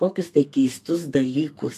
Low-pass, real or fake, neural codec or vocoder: 14.4 kHz; fake; codec, 32 kHz, 1.9 kbps, SNAC